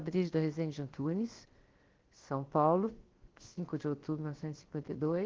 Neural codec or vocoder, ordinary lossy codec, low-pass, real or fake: codec, 16 kHz, 0.7 kbps, FocalCodec; Opus, 16 kbps; 7.2 kHz; fake